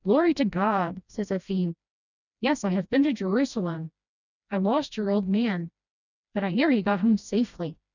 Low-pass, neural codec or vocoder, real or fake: 7.2 kHz; codec, 16 kHz, 1 kbps, FreqCodec, smaller model; fake